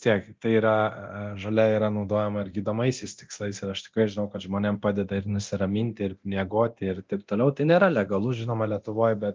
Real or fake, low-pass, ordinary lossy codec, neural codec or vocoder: fake; 7.2 kHz; Opus, 32 kbps; codec, 24 kHz, 0.9 kbps, DualCodec